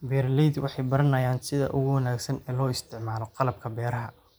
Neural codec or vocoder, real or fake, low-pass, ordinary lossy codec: vocoder, 44.1 kHz, 128 mel bands every 512 samples, BigVGAN v2; fake; none; none